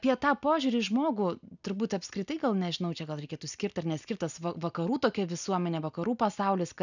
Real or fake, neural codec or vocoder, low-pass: real; none; 7.2 kHz